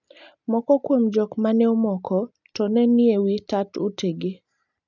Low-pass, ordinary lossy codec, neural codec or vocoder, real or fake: 7.2 kHz; none; none; real